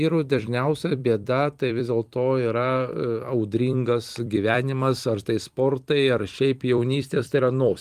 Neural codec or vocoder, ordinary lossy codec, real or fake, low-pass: vocoder, 44.1 kHz, 128 mel bands every 256 samples, BigVGAN v2; Opus, 24 kbps; fake; 14.4 kHz